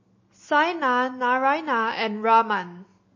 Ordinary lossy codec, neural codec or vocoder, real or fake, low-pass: MP3, 32 kbps; none; real; 7.2 kHz